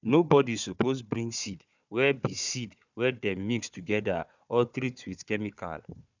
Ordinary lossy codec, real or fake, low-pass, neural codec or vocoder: none; fake; 7.2 kHz; codec, 16 kHz, 4 kbps, FunCodec, trained on Chinese and English, 50 frames a second